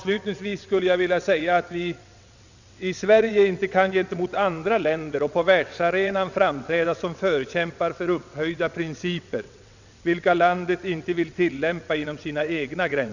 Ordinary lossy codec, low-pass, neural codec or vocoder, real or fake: none; 7.2 kHz; vocoder, 22.05 kHz, 80 mel bands, WaveNeXt; fake